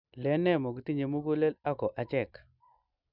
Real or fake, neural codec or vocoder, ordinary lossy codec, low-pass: real; none; none; 5.4 kHz